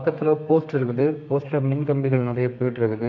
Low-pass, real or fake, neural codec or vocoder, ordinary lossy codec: 7.2 kHz; fake; codec, 32 kHz, 1.9 kbps, SNAC; MP3, 48 kbps